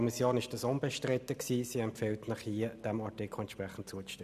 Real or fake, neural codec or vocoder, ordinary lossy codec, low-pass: real; none; none; 14.4 kHz